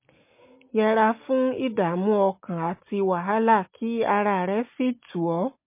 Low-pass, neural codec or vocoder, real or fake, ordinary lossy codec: 3.6 kHz; none; real; MP3, 24 kbps